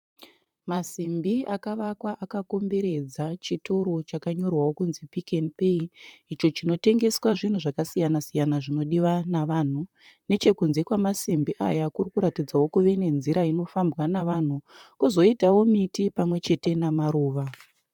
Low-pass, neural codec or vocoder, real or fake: 19.8 kHz; vocoder, 44.1 kHz, 128 mel bands, Pupu-Vocoder; fake